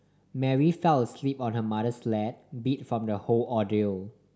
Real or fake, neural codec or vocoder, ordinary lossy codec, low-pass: real; none; none; none